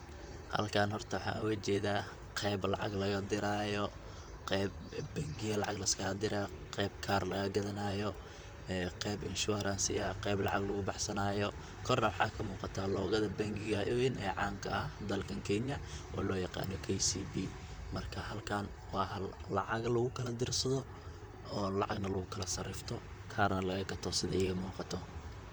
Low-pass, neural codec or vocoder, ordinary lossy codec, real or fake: none; vocoder, 44.1 kHz, 128 mel bands, Pupu-Vocoder; none; fake